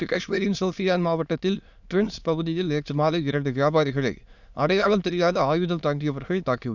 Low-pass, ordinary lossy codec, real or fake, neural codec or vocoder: 7.2 kHz; none; fake; autoencoder, 22.05 kHz, a latent of 192 numbers a frame, VITS, trained on many speakers